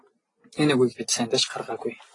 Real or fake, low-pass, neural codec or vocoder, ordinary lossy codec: real; 10.8 kHz; none; AAC, 32 kbps